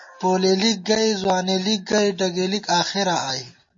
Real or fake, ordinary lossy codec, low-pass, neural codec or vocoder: real; MP3, 32 kbps; 7.2 kHz; none